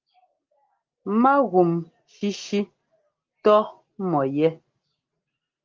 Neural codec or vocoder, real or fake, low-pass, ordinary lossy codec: none; real; 7.2 kHz; Opus, 24 kbps